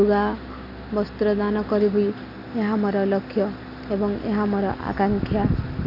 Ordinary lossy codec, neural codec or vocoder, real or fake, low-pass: none; none; real; 5.4 kHz